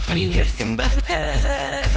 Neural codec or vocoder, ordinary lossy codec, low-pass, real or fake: codec, 16 kHz, 1 kbps, X-Codec, HuBERT features, trained on LibriSpeech; none; none; fake